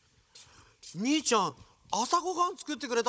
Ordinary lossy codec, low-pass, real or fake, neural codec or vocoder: none; none; fake; codec, 16 kHz, 16 kbps, FunCodec, trained on Chinese and English, 50 frames a second